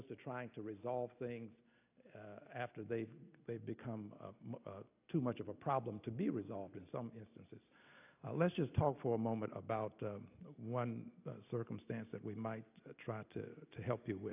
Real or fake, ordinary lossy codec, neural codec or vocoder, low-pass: real; AAC, 32 kbps; none; 3.6 kHz